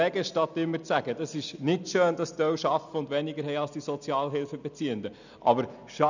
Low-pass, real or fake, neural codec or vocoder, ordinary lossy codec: 7.2 kHz; real; none; none